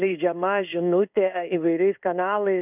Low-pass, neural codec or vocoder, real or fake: 3.6 kHz; codec, 16 kHz in and 24 kHz out, 1 kbps, XY-Tokenizer; fake